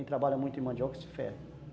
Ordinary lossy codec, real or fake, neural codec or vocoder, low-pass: none; real; none; none